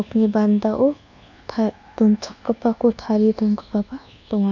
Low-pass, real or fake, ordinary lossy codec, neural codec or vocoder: 7.2 kHz; fake; none; codec, 24 kHz, 1.2 kbps, DualCodec